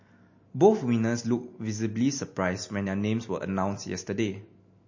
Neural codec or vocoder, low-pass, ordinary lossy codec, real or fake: none; 7.2 kHz; MP3, 32 kbps; real